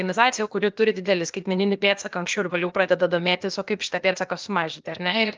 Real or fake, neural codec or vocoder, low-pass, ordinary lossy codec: fake; codec, 16 kHz, 0.8 kbps, ZipCodec; 7.2 kHz; Opus, 24 kbps